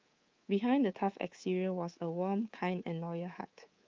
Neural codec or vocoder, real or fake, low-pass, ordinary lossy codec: codec, 24 kHz, 3.1 kbps, DualCodec; fake; 7.2 kHz; Opus, 32 kbps